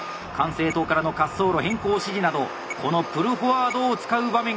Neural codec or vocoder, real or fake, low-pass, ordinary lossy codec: none; real; none; none